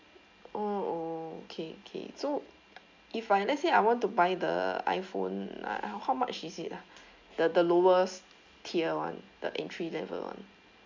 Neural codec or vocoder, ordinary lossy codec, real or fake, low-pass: none; MP3, 64 kbps; real; 7.2 kHz